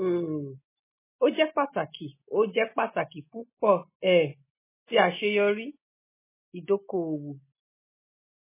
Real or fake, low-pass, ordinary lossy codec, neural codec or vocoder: real; 3.6 kHz; MP3, 16 kbps; none